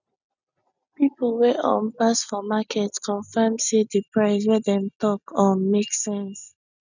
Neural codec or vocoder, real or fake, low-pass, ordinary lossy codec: none; real; 7.2 kHz; none